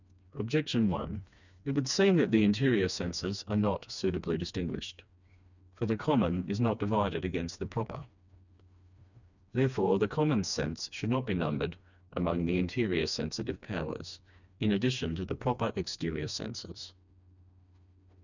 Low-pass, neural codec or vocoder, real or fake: 7.2 kHz; codec, 16 kHz, 2 kbps, FreqCodec, smaller model; fake